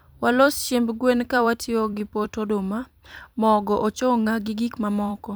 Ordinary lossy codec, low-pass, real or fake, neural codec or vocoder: none; none; fake; vocoder, 44.1 kHz, 128 mel bands every 256 samples, BigVGAN v2